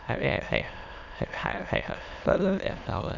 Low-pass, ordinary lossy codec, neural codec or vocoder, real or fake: 7.2 kHz; none; autoencoder, 22.05 kHz, a latent of 192 numbers a frame, VITS, trained on many speakers; fake